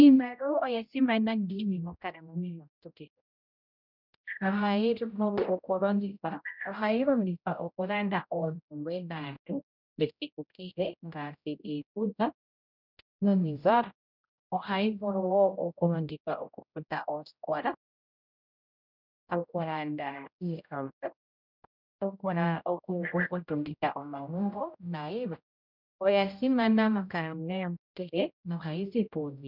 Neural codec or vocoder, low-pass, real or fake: codec, 16 kHz, 0.5 kbps, X-Codec, HuBERT features, trained on general audio; 5.4 kHz; fake